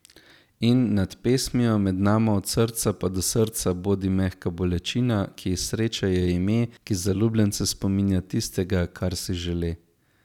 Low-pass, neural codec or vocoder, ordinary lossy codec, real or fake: 19.8 kHz; none; none; real